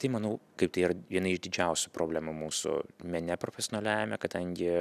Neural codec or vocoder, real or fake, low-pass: none; real; 14.4 kHz